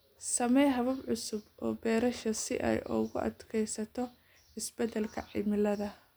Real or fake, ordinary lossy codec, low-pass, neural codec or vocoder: real; none; none; none